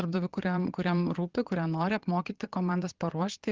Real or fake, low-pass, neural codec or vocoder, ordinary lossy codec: fake; 7.2 kHz; vocoder, 22.05 kHz, 80 mel bands, Vocos; Opus, 16 kbps